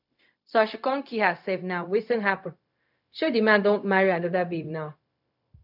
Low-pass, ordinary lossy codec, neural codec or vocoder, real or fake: 5.4 kHz; none; codec, 16 kHz, 0.4 kbps, LongCat-Audio-Codec; fake